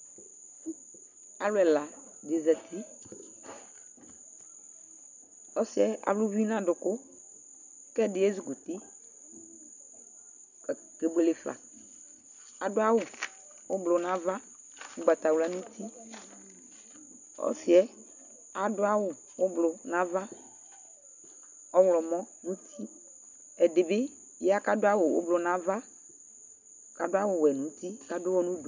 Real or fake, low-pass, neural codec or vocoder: real; 7.2 kHz; none